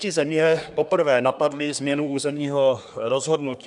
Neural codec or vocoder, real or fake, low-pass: codec, 24 kHz, 1 kbps, SNAC; fake; 10.8 kHz